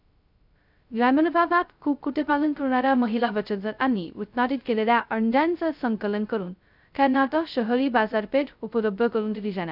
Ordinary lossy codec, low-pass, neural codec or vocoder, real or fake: none; 5.4 kHz; codec, 16 kHz, 0.2 kbps, FocalCodec; fake